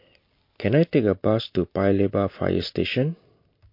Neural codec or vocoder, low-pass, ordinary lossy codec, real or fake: none; 5.4 kHz; MP3, 32 kbps; real